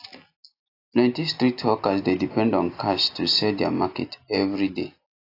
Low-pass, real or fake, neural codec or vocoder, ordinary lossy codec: 5.4 kHz; real; none; AAC, 32 kbps